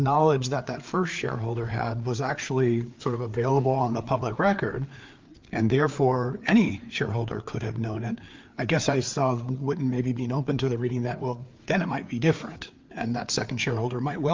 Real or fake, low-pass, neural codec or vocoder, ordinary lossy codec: fake; 7.2 kHz; codec, 16 kHz, 4 kbps, FreqCodec, larger model; Opus, 24 kbps